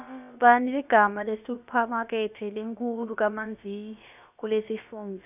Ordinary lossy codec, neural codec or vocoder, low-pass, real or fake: none; codec, 16 kHz, about 1 kbps, DyCAST, with the encoder's durations; 3.6 kHz; fake